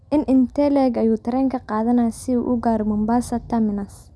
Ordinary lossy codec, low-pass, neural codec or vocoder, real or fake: none; none; none; real